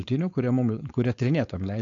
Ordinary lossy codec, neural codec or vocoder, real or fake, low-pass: AAC, 48 kbps; codec, 16 kHz, 4.8 kbps, FACodec; fake; 7.2 kHz